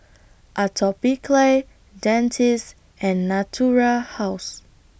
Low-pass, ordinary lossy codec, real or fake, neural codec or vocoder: none; none; real; none